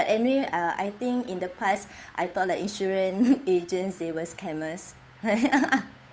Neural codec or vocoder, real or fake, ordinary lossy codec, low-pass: codec, 16 kHz, 8 kbps, FunCodec, trained on Chinese and English, 25 frames a second; fake; none; none